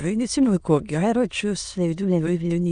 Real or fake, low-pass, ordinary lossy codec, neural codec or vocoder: fake; 9.9 kHz; Opus, 64 kbps; autoencoder, 22.05 kHz, a latent of 192 numbers a frame, VITS, trained on many speakers